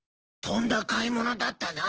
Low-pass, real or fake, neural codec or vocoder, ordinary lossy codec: 7.2 kHz; fake; codec, 16 kHz, 6 kbps, DAC; Opus, 16 kbps